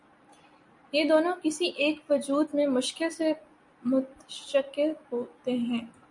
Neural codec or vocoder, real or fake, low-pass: none; real; 10.8 kHz